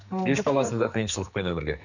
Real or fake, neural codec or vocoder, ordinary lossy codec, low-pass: fake; codec, 44.1 kHz, 2.6 kbps, SNAC; none; 7.2 kHz